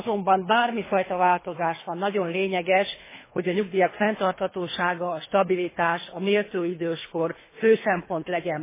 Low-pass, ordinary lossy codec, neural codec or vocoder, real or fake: 3.6 kHz; MP3, 16 kbps; codec, 24 kHz, 3 kbps, HILCodec; fake